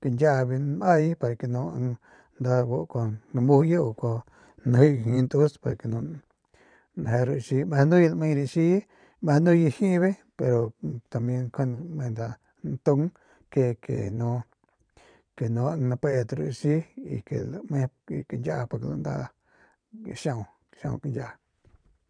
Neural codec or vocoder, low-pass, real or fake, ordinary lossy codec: vocoder, 22.05 kHz, 80 mel bands, Vocos; 9.9 kHz; fake; none